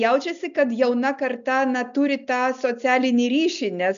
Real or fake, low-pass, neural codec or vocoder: real; 7.2 kHz; none